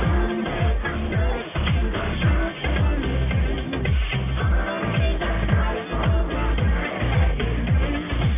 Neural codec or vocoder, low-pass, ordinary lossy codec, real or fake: codec, 44.1 kHz, 1.7 kbps, Pupu-Codec; 3.6 kHz; AAC, 24 kbps; fake